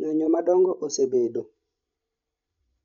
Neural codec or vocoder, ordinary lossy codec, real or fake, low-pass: codec, 16 kHz, 16 kbps, FreqCodec, larger model; none; fake; 7.2 kHz